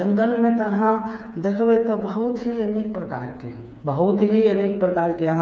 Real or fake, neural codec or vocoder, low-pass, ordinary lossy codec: fake; codec, 16 kHz, 4 kbps, FreqCodec, smaller model; none; none